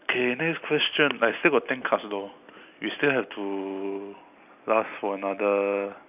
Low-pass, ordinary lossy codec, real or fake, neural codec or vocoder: 3.6 kHz; none; real; none